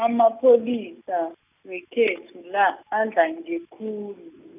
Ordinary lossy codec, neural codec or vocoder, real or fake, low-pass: none; none; real; 3.6 kHz